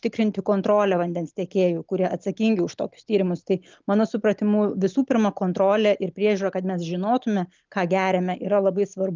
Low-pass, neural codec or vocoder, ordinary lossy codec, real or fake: 7.2 kHz; none; Opus, 32 kbps; real